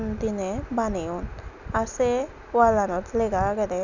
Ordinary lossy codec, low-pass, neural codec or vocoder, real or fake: none; 7.2 kHz; none; real